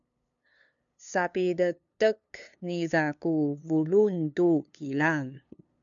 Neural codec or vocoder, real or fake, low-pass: codec, 16 kHz, 2 kbps, FunCodec, trained on LibriTTS, 25 frames a second; fake; 7.2 kHz